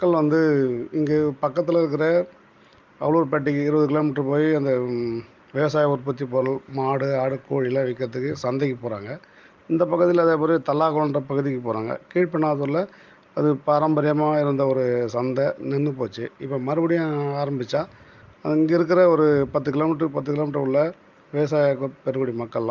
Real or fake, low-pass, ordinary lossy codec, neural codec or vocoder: real; 7.2 kHz; Opus, 32 kbps; none